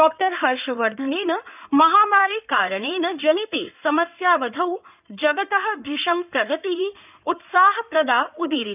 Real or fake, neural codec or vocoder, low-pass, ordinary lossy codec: fake; codec, 16 kHz in and 24 kHz out, 2.2 kbps, FireRedTTS-2 codec; 3.6 kHz; none